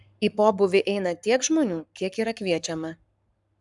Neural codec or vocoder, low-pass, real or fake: codec, 44.1 kHz, 7.8 kbps, Pupu-Codec; 10.8 kHz; fake